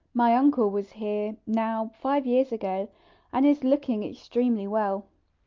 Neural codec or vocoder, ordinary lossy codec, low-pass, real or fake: none; Opus, 32 kbps; 7.2 kHz; real